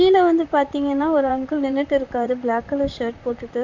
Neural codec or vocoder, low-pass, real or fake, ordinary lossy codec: vocoder, 44.1 kHz, 128 mel bands, Pupu-Vocoder; 7.2 kHz; fake; none